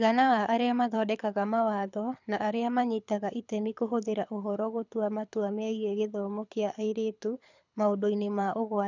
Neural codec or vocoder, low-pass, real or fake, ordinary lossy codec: codec, 24 kHz, 6 kbps, HILCodec; 7.2 kHz; fake; none